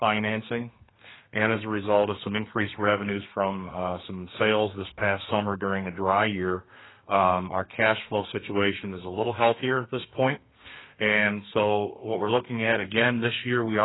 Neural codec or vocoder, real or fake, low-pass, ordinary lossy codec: codec, 32 kHz, 1.9 kbps, SNAC; fake; 7.2 kHz; AAC, 16 kbps